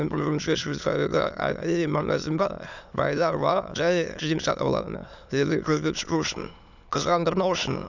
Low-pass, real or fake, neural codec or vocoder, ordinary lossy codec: 7.2 kHz; fake; autoencoder, 22.05 kHz, a latent of 192 numbers a frame, VITS, trained on many speakers; none